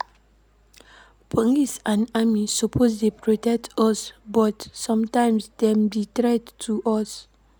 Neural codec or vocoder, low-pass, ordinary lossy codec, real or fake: none; none; none; real